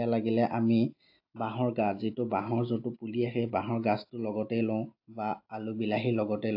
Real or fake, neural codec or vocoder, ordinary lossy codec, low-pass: real; none; AAC, 32 kbps; 5.4 kHz